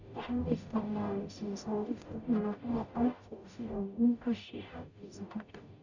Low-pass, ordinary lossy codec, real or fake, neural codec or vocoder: 7.2 kHz; none; fake; codec, 44.1 kHz, 0.9 kbps, DAC